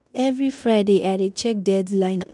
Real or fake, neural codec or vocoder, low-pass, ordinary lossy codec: fake; codec, 16 kHz in and 24 kHz out, 0.9 kbps, LongCat-Audio-Codec, fine tuned four codebook decoder; 10.8 kHz; none